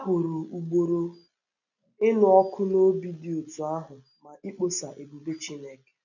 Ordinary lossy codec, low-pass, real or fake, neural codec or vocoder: none; 7.2 kHz; real; none